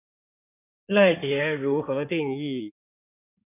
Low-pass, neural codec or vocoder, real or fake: 3.6 kHz; codec, 16 kHz in and 24 kHz out, 2.2 kbps, FireRedTTS-2 codec; fake